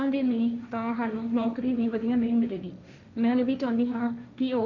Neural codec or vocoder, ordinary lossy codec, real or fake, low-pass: codec, 16 kHz, 1.1 kbps, Voila-Tokenizer; none; fake; 7.2 kHz